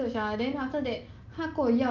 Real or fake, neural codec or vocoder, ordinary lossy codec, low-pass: real; none; Opus, 32 kbps; 7.2 kHz